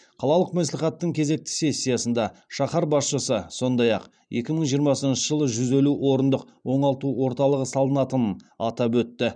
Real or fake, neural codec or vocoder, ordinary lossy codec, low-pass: fake; vocoder, 44.1 kHz, 128 mel bands every 512 samples, BigVGAN v2; none; 9.9 kHz